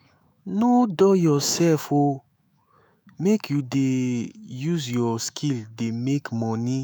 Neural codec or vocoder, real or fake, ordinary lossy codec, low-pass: autoencoder, 48 kHz, 128 numbers a frame, DAC-VAE, trained on Japanese speech; fake; none; none